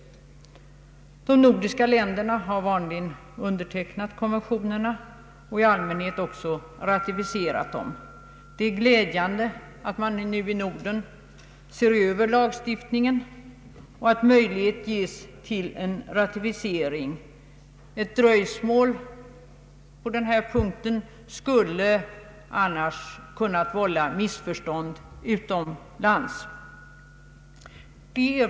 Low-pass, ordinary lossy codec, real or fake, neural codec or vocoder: none; none; real; none